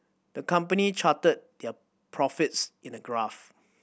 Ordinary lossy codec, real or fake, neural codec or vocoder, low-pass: none; real; none; none